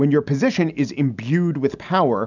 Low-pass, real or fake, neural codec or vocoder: 7.2 kHz; real; none